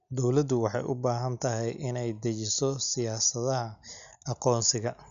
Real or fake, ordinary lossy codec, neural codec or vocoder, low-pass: real; none; none; 7.2 kHz